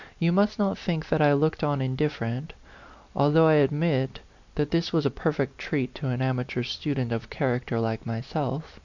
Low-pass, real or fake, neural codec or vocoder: 7.2 kHz; real; none